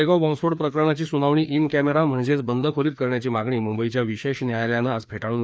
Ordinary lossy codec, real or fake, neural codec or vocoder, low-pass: none; fake; codec, 16 kHz, 2 kbps, FreqCodec, larger model; none